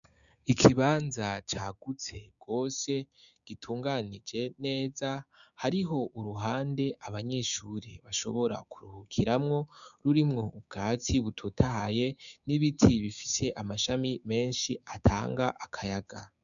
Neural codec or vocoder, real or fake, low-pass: codec, 16 kHz, 6 kbps, DAC; fake; 7.2 kHz